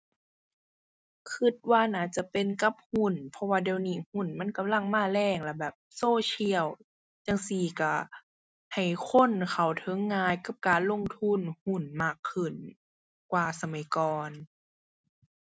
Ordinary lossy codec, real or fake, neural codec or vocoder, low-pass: none; real; none; none